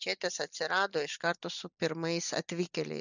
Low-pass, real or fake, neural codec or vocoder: 7.2 kHz; real; none